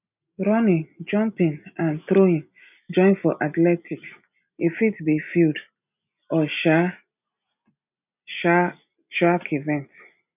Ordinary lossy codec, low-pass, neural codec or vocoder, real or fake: none; 3.6 kHz; none; real